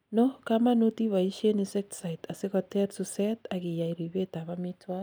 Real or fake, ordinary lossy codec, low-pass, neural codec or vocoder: real; none; none; none